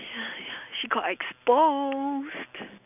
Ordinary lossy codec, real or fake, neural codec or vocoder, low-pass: none; real; none; 3.6 kHz